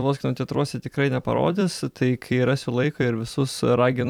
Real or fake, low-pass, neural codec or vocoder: fake; 19.8 kHz; vocoder, 44.1 kHz, 128 mel bands every 256 samples, BigVGAN v2